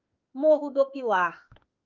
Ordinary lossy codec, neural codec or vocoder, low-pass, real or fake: Opus, 24 kbps; autoencoder, 48 kHz, 32 numbers a frame, DAC-VAE, trained on Japanese speech; 7.2 kHz; fake